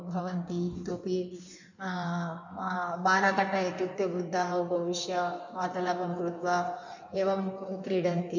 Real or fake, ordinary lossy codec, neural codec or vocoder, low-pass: fake; none; codec, 16 kHz in and 24 kHz out, 1.1 kbps, FireRedTTS-2 codec; 7.2 kHz